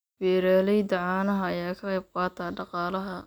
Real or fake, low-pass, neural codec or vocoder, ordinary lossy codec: real; none; none; none